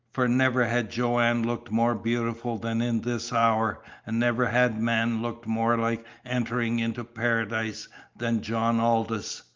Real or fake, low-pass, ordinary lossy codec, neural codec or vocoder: real; 7.2 kHz; Opus, 24 kbps; none